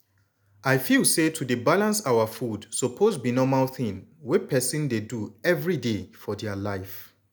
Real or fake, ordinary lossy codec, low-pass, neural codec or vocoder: real; none; none; none